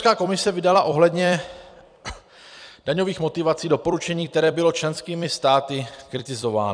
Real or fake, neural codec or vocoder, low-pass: fake; vocoder, 44.1 kHz, 128 mel bands every 256 samples, BigVGAN v2; 9.9 kHz